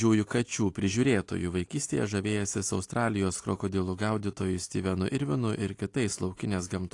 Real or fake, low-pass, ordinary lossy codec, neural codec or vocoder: real; 10.8 kHz; AAC, 48 kbps; none